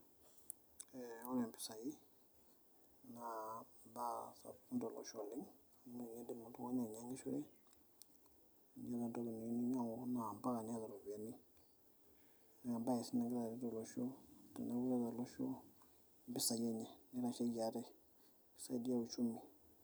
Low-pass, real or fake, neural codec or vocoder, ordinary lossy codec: none; real; none; none